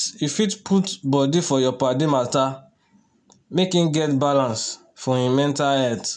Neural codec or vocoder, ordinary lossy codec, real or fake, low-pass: none; none; real; 9.9 kHz